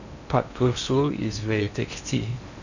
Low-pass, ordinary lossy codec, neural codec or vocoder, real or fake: 7.2 kHz; none; codec, 16 kHz in and 24 kHz out, 0.6 kbps, FocalCodec, streaming, 2048 codes; fake